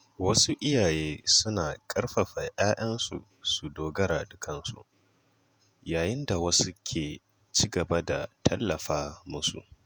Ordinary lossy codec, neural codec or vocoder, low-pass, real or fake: none; vocoder, 48 kHz, 128 mel bands, Vocos; none; fake